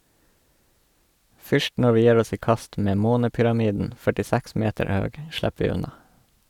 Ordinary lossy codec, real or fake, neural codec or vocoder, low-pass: none; real; none; 19.8 kHz